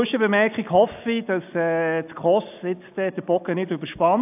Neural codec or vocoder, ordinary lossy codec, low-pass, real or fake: none; AAC, 32 kbps; 3.6 kHz; real